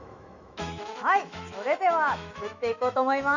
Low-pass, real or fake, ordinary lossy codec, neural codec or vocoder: 7.2 kHz; fake; none; autoencoder, 48 kHz, 128 numbers a frame, DAC-VAE, trained on Japanese speech